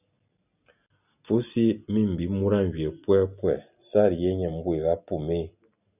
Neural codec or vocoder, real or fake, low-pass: none; real; 3.6 kHz